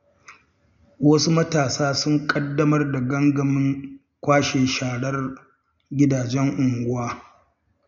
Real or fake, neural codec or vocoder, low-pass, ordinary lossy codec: real; none; 7.2 kHz; none